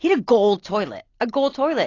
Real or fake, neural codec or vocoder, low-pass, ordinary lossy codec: real; none; 7.2 kHz; AAC, 32 kbps